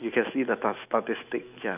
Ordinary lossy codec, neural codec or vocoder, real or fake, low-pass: none; autoencoder, 48 kHz, 128 numbers a frame, DAC-VAE, trained on Japanese speech; fake; 3.6 kHz